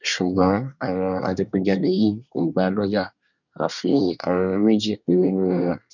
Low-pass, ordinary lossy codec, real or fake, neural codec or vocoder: 7.2 kHz; none; fake; codec, 24 kHz, 1 kbps, SNAC